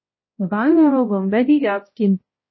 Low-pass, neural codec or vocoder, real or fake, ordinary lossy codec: 7.2 kHz; codec, 16 kHz, 0.5 kbps, X-Codec, HuBERT features, trained on balanced general audio; fake; MP3, 24 kbps